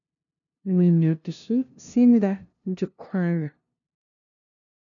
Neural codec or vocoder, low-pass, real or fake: codec, 16 kHz, 0.5 kbps, FunCodec, trained on LibriTTS, 25 frames a second; 7.2 kHz; fake